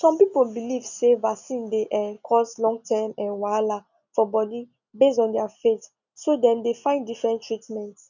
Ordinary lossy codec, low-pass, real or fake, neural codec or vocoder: none; 7.2 kHz; real; none